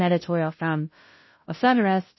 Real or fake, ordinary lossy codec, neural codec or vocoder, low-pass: fake; MP3, 24 kbps; codec, 16 kHz, 0.5 kbps, FunCodec, trained on Chinese and English, 25 frames a second; 7.2 kHz